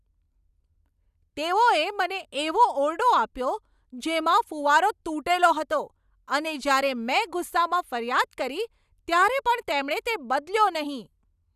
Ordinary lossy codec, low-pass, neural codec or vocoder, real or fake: none; 14.4 kHz; none; real